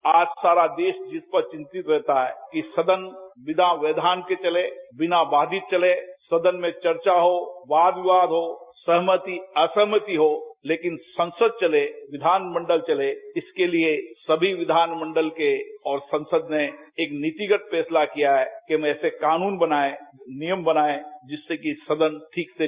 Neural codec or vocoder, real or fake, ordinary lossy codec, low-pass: none; real; Opus, 64 kbps; 3.6 kHz